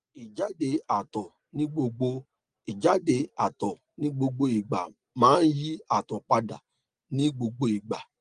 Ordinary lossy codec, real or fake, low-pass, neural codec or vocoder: Opus, 24 kbps; real; 9.9 kHz; none